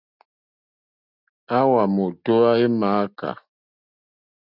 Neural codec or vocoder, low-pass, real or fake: none; 5.4 kHz; real